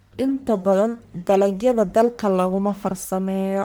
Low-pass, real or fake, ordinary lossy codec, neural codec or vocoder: none; fake; none; codec, 44.1 kHz, 1.7 kbps, Pupu-Codec